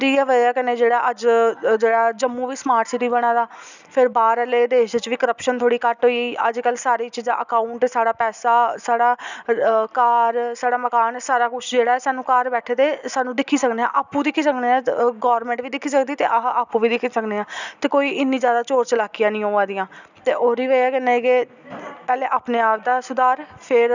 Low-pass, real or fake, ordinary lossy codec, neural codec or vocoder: 7.2 kHz; real; none; none